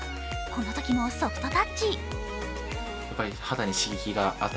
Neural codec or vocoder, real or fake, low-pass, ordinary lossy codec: none; real; none; none